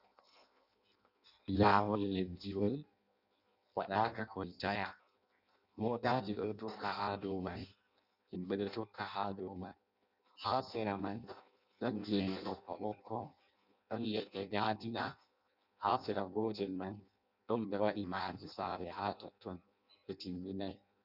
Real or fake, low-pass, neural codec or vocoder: fake; 5.4 kHz; codec, 16 kHz in and 24 kHz out, 0.6 kbps, FireRedTTS-2 codec